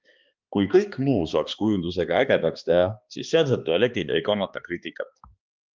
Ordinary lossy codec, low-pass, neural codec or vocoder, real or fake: Opus, 24 kbps; 7.2 kHz; codec, 16 kHz, 2 kbps, X-Codec, HuBERT features, trained on balanced general audio; fake